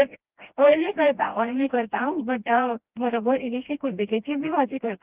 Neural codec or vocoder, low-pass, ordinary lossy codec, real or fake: codec, 16 kHz, 1 kbps, FreqCodec, smaller model; 3.6 kHz; Opus, 32 kbps; fake